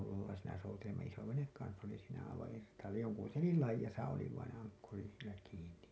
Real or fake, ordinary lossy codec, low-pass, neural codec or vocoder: real; none; none; none